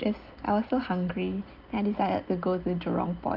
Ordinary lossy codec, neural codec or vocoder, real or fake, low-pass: Opus, 24 kbps; none; real; 5.4 kHz